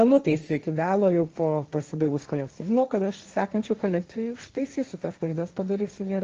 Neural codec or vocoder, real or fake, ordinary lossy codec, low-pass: codec, 16 kHz, 1.1 kbps, Voila-Tokenizer; fake; Opus, 24 kbps; 7.2 kHz